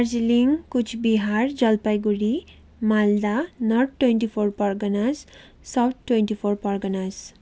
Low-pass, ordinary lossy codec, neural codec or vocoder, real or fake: none; none; none; real